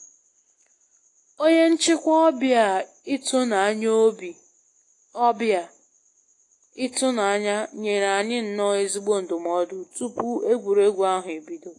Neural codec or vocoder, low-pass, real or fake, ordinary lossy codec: none; 10.8 kHz; real; AAC, 48 kbps